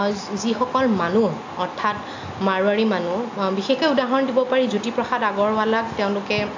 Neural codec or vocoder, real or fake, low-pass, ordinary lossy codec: none; real; 7.2 kHz; AAC, 48 kbps